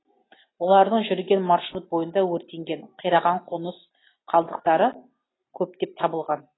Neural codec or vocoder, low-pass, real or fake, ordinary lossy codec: none; 7.2 kHz; real; AAC, 16 kbps